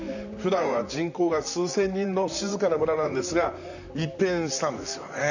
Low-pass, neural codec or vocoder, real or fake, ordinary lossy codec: 7.2 kHz; vocoder, 44.1 kHz, 128 mel bands, Pupu-Vocoder; fake; none